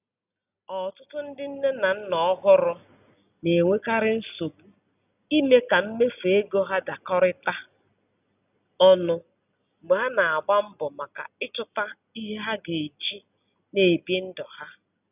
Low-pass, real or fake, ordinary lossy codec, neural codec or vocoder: 3.6 kHz; real; none; none